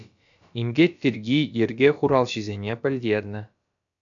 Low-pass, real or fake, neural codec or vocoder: 7.2 kHz; fake; codec, 16 kHz, about 1 kbps, DyCAST, with the encoder's durations